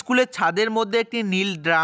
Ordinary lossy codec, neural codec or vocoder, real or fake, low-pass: none; none; real; none